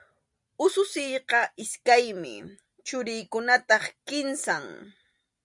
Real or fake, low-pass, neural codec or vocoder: real; 10.8 kHz; none